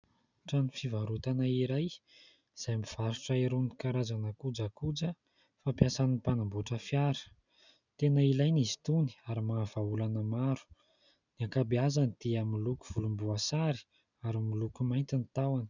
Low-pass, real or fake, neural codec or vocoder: 7.2 kHz; real; none